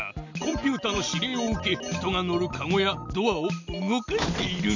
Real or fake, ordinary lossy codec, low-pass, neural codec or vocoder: real; none; 7.2 kHz; none